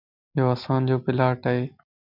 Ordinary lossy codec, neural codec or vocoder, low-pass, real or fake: AAC, 48 kbps; none; 5.4 kHz; real